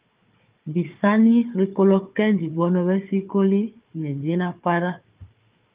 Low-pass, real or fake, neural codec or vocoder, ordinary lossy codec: 3.6 kHz; fake; codec, 16 kHz, 4 kbps, FunCodec, trained on Chinese and English, 50 frames a second; Opus, 32 kbps